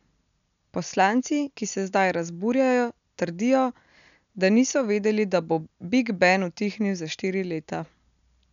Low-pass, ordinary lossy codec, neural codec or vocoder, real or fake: 7.2 kHz; none; none; real